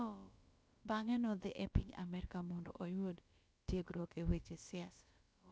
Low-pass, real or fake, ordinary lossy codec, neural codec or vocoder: none; fake; none; codec, 16 kHz, about 1 kbps, DyCAST, with the encoder's durations